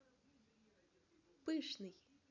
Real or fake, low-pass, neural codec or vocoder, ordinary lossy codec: real; 7.2 kHz; none; none